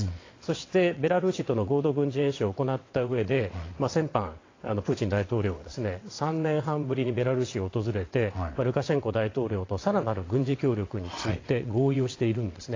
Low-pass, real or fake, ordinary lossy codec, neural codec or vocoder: 7.2 kHz; fake; AAC, 32 kbps; vocoder, 22.05 kHz, 80 mel bands, WaveNeXt